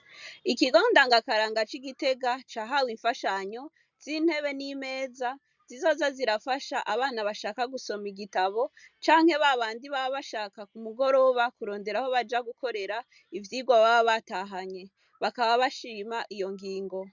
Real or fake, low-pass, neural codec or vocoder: real; 7.2 kHz; none